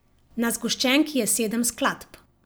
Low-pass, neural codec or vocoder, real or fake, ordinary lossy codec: none; none; real; none